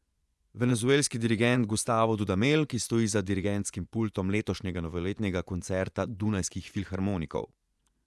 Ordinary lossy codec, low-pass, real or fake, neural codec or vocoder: none; none; fake; vocoder, 24 kHz, 100 mel bands, Vocos